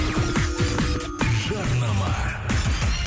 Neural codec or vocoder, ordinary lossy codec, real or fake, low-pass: none; none; real; none